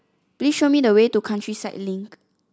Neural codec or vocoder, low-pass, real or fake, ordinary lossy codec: none; none; real; none